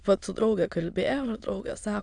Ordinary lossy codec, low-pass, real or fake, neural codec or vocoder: AAC, 64 kbps; 9.9 kHz; fake; autoencoder, 22.05 kHz, a latent of 192 numbers a frame, VITS, trained on many speakers